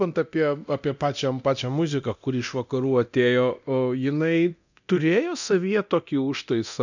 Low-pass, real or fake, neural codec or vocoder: 7.2 kHz; fake; codec, 24 kHz, 0.9 kbps, DualCodec